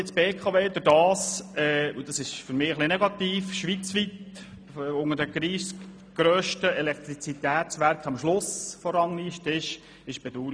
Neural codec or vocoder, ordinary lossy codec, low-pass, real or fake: none; none; 9.9 kHz; real